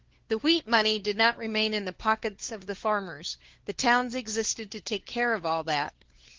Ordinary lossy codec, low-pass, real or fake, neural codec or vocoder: Opus, 16 kbps; 7.2 kHz; fake; codec, 16 kHz, 4 kbps, FunCodec, trained on LibriTTS, 50 frames a second